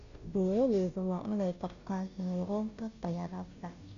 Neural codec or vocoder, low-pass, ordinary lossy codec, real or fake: codec, 16 kHz, 0.5 kbps, FunCodec, trained on Chinese and English, 25 frames a second; 7.2 kHz; none; fake